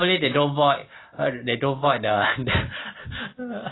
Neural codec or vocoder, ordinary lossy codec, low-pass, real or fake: none; AAC, 16 kbps; 7.2 kHz; real